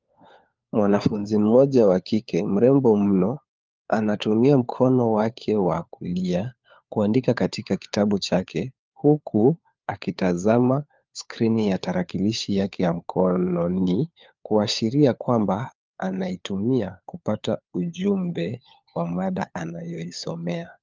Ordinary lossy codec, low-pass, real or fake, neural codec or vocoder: Opus, 24 kbps; 7.2 kHz; fake; codec, 16 kHz, 4 kbps, FunCodec, trained on LibriTTS, 50 frames a second